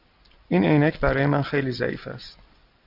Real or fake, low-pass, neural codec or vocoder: real; 5.4 kHz; none